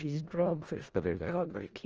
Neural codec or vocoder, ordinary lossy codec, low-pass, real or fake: codec, 16 kHz in and 24 kHz out, 0.4 kbps, LongCat-Audio-Codec, four codebook decoder; Opus, 24 kbps; 7.2 kHz; fake